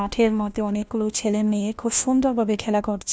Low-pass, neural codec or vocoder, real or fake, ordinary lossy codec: none; codec, 16 kHz, 1 kbps, FunCodec, trained on LibriTTS, 50 frames a second; fake; none